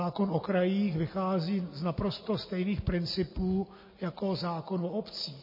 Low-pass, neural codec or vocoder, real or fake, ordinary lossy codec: 5.4 kHz; none; real; MP3, 24 kbps